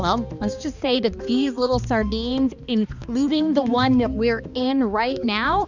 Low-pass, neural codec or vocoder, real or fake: 7.2 kHz; codec, 16 kHz, 2 kbps, X-Codec, HuBERT features, trained on balanced general audio; fake